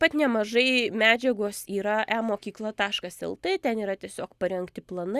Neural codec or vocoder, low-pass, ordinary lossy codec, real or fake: none; 14.4 kHz; Opus, 64 kbps; real